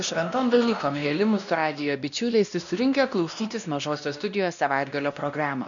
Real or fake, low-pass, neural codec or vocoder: fake; 7.2 kHz; codec, 16 kHz, 1 kbps, X-Codec, WavLM features, trained on Multilingual LibriSpeech